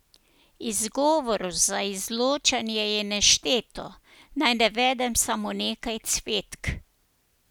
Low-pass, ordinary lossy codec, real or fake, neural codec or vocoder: none; none; real; none